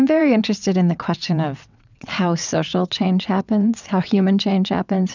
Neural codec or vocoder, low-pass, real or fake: vocoder, 22.05 kHz, 80 mel bands, WaveNeXt; 7.2 kHz; fake